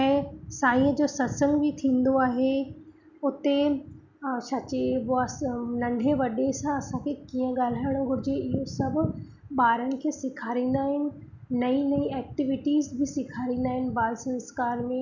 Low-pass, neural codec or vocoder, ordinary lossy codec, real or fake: 7.2 kHz; none; none; real